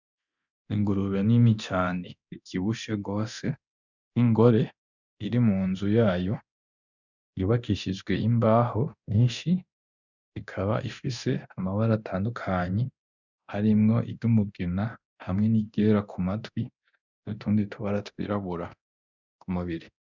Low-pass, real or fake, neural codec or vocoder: 7.2 kHz; fake; codec, 24 kHz, 0.9 kbps, DualCodec